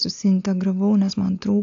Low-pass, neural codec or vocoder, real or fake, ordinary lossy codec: 7.2 kHz; none; real; AAC, 48 kbps